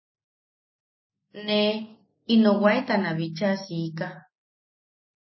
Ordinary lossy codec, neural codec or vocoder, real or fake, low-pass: MP3, 24 kbps; none; real; 7.2 kHz